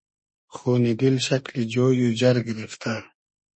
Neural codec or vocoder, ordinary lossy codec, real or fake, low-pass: autoencoder, 48 kHz, 32 numbers a frame, DAC-VAE, trained on Japanese speech; MP3, 32 kbps; fake; 9.9 kHz